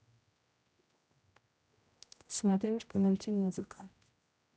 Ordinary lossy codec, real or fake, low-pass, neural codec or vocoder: none; fake; none; codec, 16 kHz, 0.5 kbps, X-Codec, HuBERT features, trained on general audio